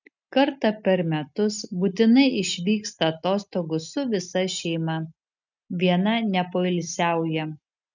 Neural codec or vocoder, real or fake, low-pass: none; real; 7.2 kHz